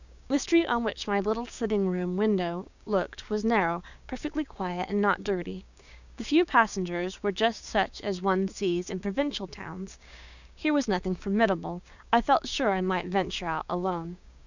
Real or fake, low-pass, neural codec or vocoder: fake; 7.2 kHz; codec, 16 kHz, 6 kbps, DAC